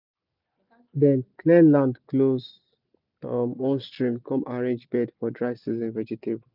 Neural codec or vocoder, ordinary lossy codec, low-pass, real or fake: none; none; 5.4 kHz; real